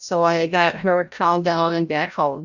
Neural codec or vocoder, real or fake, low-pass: codec, 16 kHz, 0.5 kbps, FreqCodec, larger model; fake; 7.2 kHz